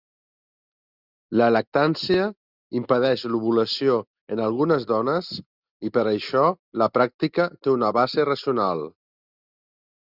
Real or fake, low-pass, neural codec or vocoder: real; 5.4 kHz; none